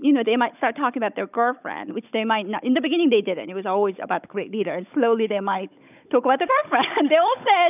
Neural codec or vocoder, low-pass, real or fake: codec, 16 kHz, 16 kbps, FunCodec, trained on Chinese and English, 50 frames a second; 3.6 kHz; fake